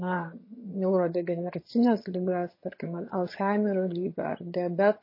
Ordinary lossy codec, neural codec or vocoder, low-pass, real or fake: MP3, 24 kbps; vocoder, 22.05 kHz, 80 mel bands, HiFi-GAN; 5.4 kHz; fake